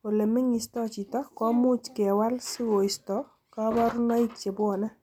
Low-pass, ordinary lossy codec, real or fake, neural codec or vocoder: 19.8 kHz; none; real; none